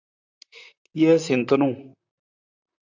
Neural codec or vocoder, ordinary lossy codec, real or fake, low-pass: vocoder, 44.1 kHz, 128 mel bands, Pupu-Vocoder; MP3, 64 kbps; fake; 7.2 kHz